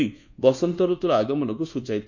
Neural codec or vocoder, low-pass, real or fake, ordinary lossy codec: codec, 24 kHz, 1.2 kbps, DualCodec; 7.2 kHz; fake; none